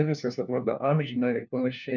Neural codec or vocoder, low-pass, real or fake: codec, 16 kHz, 1 kbps, FunCodec, trained on LibriTTS, 50 frames a second; 7.2 kHz; fake